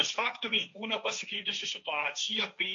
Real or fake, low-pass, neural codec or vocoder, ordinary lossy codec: fake; 7.2 kHz; codec, 16 kHz, 1.1 kbps, Voila-Tokenizer; AAC, 48 kbps